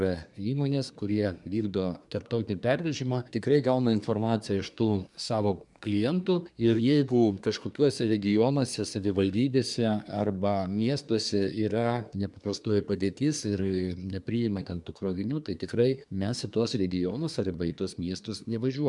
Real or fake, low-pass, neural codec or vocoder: fake; 10.8 kHz; codec, 24 kHz, 1 kbps, SNAC